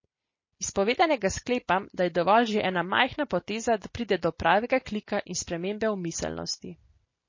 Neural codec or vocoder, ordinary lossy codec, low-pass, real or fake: none; MP3, 32 kbps; 7.2 kHz; real